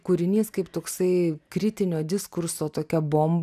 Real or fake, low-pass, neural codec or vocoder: real; 14.4 kHz; none